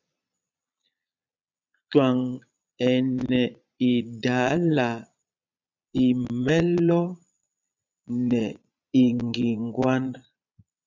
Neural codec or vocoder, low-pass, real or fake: vocoder, 22.05 kHz, 80 mel bands, Vocos; 7.2 kHz; fake